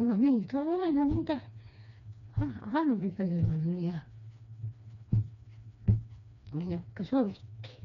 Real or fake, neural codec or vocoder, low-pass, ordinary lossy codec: fake; codec, 16 kHz, 2 kbps, FreqCodec, smaller model; 7.2 kHz; none